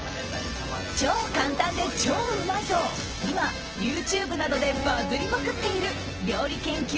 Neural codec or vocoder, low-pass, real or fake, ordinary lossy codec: vocoder, 24 kHz, 100 mel bands, Vocos; 7.2 kHz; fake; Opus, 16 kbps